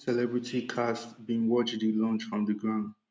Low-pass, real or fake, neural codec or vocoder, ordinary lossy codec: none; real; none; none